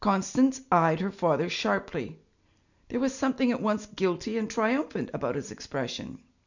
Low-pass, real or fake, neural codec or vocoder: 7.2 kHz; real; none